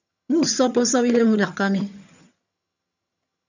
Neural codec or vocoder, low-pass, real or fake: vocoder, 22.05 kHz, 80 mel bands, HiFi-GAN; 7.2 kHz; fake